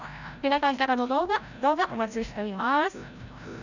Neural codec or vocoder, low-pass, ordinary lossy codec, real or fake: codec, 16 kHz, 0.5 kbps, FreqCodec, larger model; 7.2 kHz; none; fake